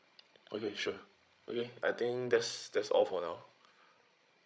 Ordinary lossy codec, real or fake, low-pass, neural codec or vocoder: none; fake; none; codec, 16 kHz, 16 kbps, FreqCodec, larger model